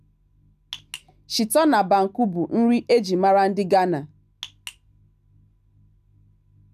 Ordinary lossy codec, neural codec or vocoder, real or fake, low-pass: none; none; real; 14.4 kHz